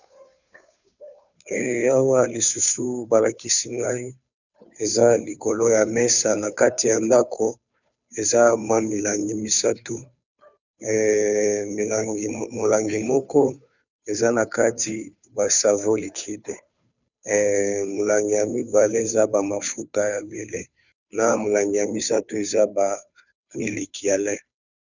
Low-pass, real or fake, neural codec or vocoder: 7.2 kHz; fake; codec, 16 kHz, 2 kbps, FunCodec, trained on Chinese and English, 25 frames a second